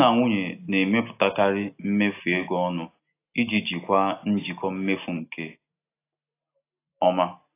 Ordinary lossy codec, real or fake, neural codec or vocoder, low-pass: AAC, 24 kbps; real; none; 3.6 kHz